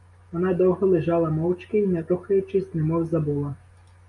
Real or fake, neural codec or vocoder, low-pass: real; none; 10.8 kHz